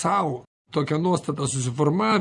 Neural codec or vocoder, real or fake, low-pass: none; real; 10.8 kHz